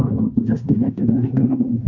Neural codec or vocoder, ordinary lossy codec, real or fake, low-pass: codec, 16 kHz in and 24 kHz out, 0.9 kbps, LongCat-Audio-Codec, fine tuned four codebook decoder; none; fake; 7.2 kHz